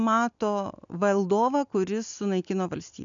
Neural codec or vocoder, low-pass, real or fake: none; 7.2 kHz; real